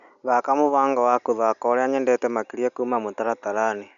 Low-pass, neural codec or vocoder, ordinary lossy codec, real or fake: 7.2 kHz; none; none; real